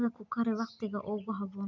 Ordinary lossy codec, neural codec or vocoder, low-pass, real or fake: none; none; 7.2 kHz; real